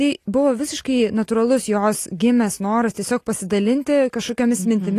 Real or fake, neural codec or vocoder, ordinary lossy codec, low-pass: real; none; AAC, 48 kbps; 14.4 kHz